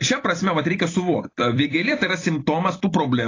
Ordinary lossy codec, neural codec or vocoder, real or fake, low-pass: AAC, 32 kbps; vocoder, 44.1 kHz, 128 mel bands every 256 samples, BigVGAN v2; fake; 7.2 kHz